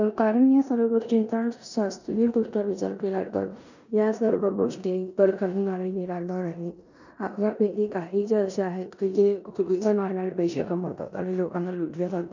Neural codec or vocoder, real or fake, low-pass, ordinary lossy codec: codec, 16 kHz in and 24 kHz out, 0.9 kbps, LongCat-Audio-Codec, four codebook decoder; fake; 7.2 kHz; none